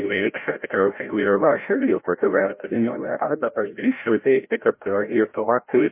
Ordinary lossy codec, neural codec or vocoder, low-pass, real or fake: MP3, 24 kbps; codec, 16 kHz, 0.5 kbps, FreqCodec, larger model; 3.6 kHz; fake